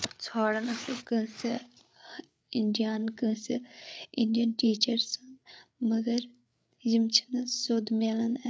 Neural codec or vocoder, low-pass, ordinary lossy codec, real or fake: codec, 16 kHz, 8 kbps, FreqCodec, larger model; none; none; fake